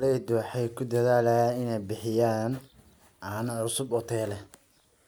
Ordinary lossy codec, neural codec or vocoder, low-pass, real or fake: none; none; none; real